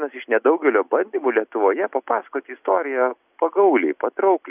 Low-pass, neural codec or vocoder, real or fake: 3.6 kHz; none; real